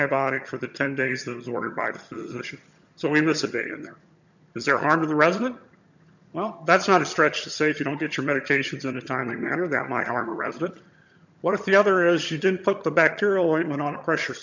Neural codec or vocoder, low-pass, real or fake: vocoder, 22.05 kHz, 80 mel bands, HiFi-GAN; 7.2 kHz; fake